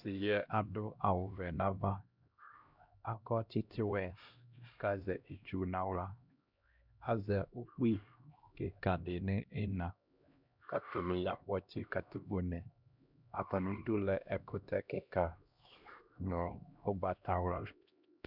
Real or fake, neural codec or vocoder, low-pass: fake; codec, 16 kHz, 1 kbps, X-Codec, HuBERT features, trained on LibriSpeech; 5.4 kHz